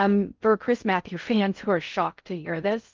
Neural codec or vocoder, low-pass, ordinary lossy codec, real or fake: codec, 16 kHz in and 24 kHz out, 0.6 kbps, FocalCodec, streaming, 4096 codes; 7.2 kHz; Opus, 24 kbps; fake